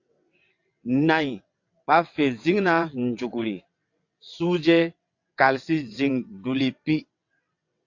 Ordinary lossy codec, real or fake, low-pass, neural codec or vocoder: AAC, 48 kbps; fake; 7.2 kHz; vocoder, 22.05 kHz, 80 mel bands, WaveNeXt